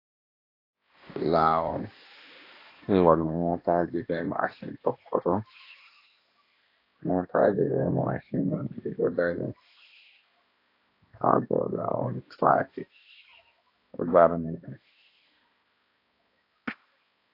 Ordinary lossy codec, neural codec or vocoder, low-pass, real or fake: AAC, 32 kbps; codec, 16 kHz, 1 kbps, X-Codec, HuBERT features, trained on balanced general audio; 5.4 kHz; fake